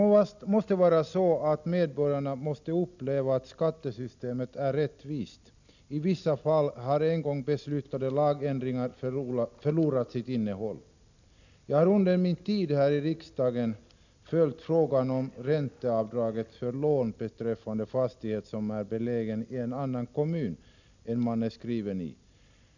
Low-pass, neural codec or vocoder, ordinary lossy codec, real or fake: 7.2 kHz; none; none; real